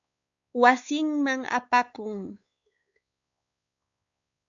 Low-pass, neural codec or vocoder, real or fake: 7.2 kHz; codec, 16 kHz, 4 kbps, X-Codec, WavLM features, trained on Multilingual LibriSpeech; fake